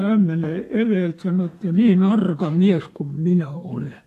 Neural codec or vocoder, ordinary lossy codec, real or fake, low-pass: codec, 32 kHz, 1.9 kbps, SNAC; AAC, 64 kbps; fake; 14.4 kHz